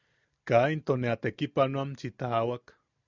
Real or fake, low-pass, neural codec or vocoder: real; 7.2 kHz; none